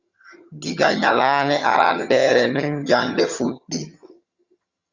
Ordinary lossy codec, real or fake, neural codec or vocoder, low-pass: Opus, 64 kbps; fake; vocoder, 22.05 kHz, 80 mel bands, HiFi-GAN; 7.2 kHz